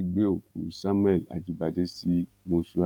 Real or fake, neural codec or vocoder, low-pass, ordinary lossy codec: fake; codec, 44.1 kHz, 7.8 kbps, DAC; 19.8 kHz; none